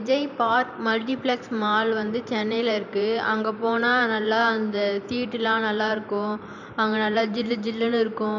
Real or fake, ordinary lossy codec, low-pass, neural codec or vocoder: fake; none; 7.2 kHz; vocoder, 44.1 kHz, 128 mel bands every 512 samples, BigVGAN v2